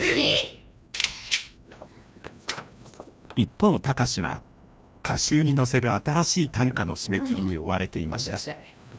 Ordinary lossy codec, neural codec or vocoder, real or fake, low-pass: none; codec, 16 kHz, 1 kbps, FreqCodec, larger model; fake; none